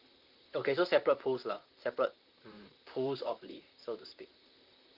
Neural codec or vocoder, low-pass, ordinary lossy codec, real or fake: vocoder, 44.1 kHz, 128 mel bands, Pupu-Vocoder; 5.4 kHz; Opus, 32 kbps; fake